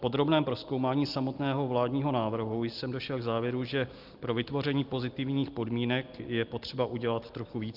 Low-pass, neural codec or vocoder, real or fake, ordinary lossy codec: 5.4 kHz; none; real; Opus, 32 kbps